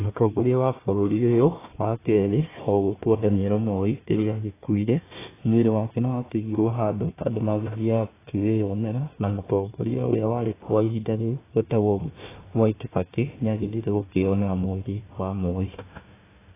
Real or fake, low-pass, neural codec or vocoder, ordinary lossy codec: fake; 3.6 kHz; codec, 16 kHz, 1 kbps, FunCodec, trained on Chinese and English, 50 frames a second; AAC, 16 kbps